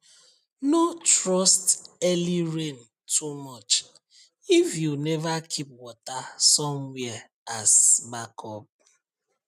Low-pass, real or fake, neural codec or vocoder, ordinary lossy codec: 14.4 kHz; real; none; none